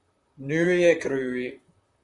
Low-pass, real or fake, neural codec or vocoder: 10.8 kHz; fake; vocoder, 44.1 kHz, 128 mel bands, Pupu-Vocoder